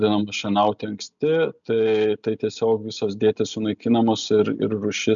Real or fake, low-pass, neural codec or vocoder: real; 7.2 kHz; none